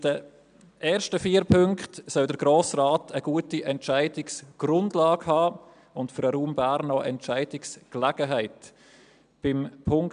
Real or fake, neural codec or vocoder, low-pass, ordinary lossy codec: real; none; 9.9 kHz; none